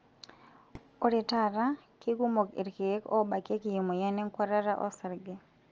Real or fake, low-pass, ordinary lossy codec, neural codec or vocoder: real; 7.2 kHz; Opus, 24 kbps; none